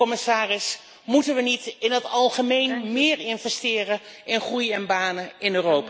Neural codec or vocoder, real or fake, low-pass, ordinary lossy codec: none; real; none; none